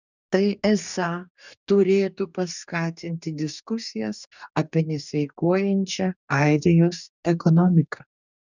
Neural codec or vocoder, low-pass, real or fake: codec, 44.1 kHz, 2.6 kbps, DAC; 7.2 kHz; fake